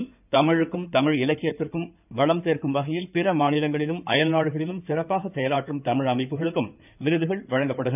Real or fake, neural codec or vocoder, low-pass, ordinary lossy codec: fake; codec, 16 kHz in and 24 kHz out, 2.2 kbps, FireRedTTS-2 codec; 3.6 kHz; none